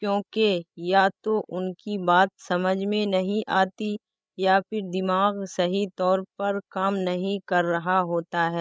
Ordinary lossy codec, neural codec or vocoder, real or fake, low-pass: none; codec, 16 kHz, 16 kbps, FreqCodec, larger model; fake; none